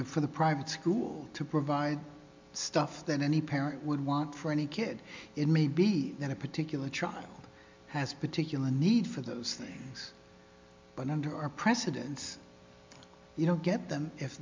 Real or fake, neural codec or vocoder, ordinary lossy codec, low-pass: real; none; MP3, 64 kbps; 7.2 kHz